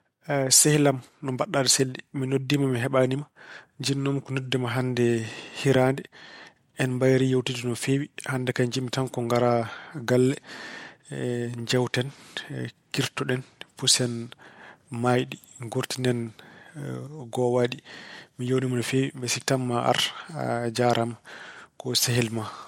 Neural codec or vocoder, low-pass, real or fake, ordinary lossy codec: none; 19.8 kHz; real; MP3, 64 kbps